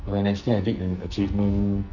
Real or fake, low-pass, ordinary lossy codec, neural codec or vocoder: fake; 7.2 kHz; none; codec, 44.1 kHz, 2.6 kbps, SNAC